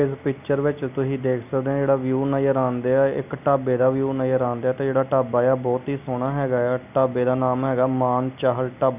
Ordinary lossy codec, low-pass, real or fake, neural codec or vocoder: none; 3.6 kHz; real; none